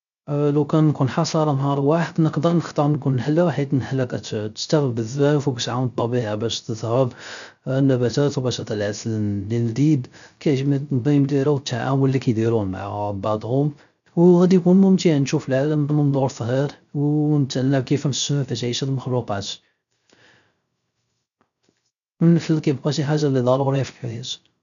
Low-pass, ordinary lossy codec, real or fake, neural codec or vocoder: 7.2 kHz; none; fake; codec, 16 kHz, 0.3 kbps, FocalCodec